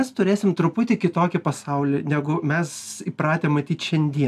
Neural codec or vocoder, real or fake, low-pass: none; real; 14.4 kHz